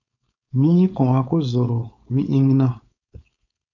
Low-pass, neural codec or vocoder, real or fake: 7.2 kHz; codec, 16 kHz, 4.8 kbps, FACodec; fake